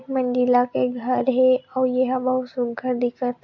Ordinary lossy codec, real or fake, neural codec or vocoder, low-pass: MP3, 48 kbps; real; none; 7.2 kHz